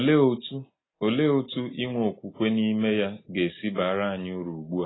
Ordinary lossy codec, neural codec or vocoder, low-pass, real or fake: AAC, 16 kbps; none; 7.2 kHz; real